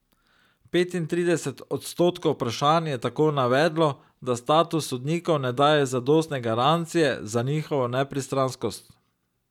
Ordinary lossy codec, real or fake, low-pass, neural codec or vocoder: none; real; 19.8 kHz; none